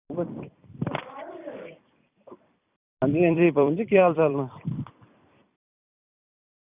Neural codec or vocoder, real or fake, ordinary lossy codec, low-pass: vocoder, 44.1 kHz, 128 mel bands every 256 samples, BigVGAN v2; fake; Opus, 64 kbps; 3.6 kHz